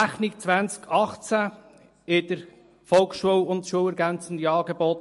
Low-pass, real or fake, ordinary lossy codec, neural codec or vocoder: 14.4 kHz; real; MP3, 48 kbps; none